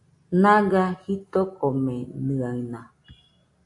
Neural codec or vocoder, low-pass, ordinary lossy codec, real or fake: none; 10.8 kHz; AAC, 64 kbps; real